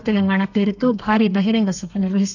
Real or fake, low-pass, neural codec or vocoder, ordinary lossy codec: fake; 7.2 kHz; codec, 32 kHz, 1.9 kbps, SNAC; none